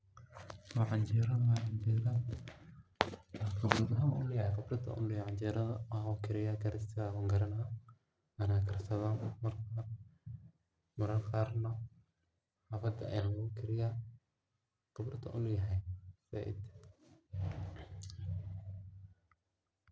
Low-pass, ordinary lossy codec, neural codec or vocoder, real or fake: none; none; none; real